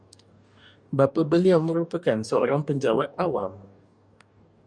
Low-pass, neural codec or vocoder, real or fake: 9.9 kHz; codec, 44.1 kHz, 2.6 kbps, DAC; fake